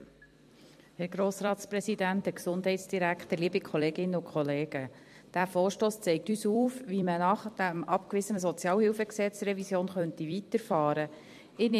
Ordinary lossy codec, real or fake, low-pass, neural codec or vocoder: MP3, 64 kbps; fake; 14.4 kHz; vocoder, 44.1 kHz, 128 mel bands every 256 samples, BigVGAN v2